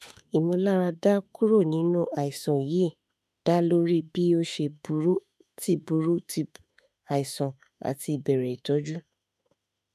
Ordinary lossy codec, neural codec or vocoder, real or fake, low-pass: none; autoencoder, 48 kHz, 32 numbers a frame, DAC-VAE, trained on Japanese speech; fake; 14.4 kHz